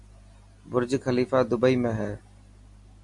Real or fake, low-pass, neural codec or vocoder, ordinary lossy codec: real; 10.8 kHz; none; AAC, 64 kbps